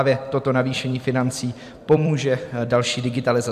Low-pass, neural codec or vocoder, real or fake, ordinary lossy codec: 14.4 kHz; vocoder, 44.1 kHz, 128 mel bands every 256 samples, BigVGAN v2; fake; AAC, 96 kbps